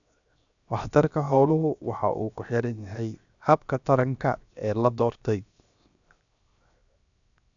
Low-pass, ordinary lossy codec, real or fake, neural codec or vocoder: 7.2 kHz; none; fake; codec, 16 kHz, 0.7 kbps, FocalCodec